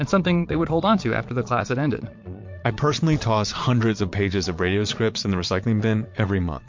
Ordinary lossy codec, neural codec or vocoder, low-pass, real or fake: MP3, 48 kbps; vocoder, 22.05 kHz, 80 mel bands, Vocos; 7.2 kHz; fake